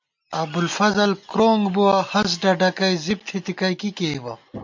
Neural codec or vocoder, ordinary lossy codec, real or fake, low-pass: none; MP3, 48 kbps; real; 7.2 kHz